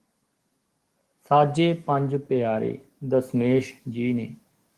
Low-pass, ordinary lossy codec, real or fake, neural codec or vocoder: 14.4 kHz; Opus, 24 kbps; fake; codec, 44.1 kHz, 7.8 kbps, DAC